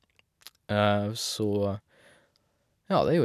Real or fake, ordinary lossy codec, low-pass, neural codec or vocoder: real; none; 14.4 kHz; none